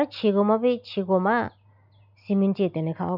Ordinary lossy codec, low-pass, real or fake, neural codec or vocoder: none; 5.4 kHz; real; none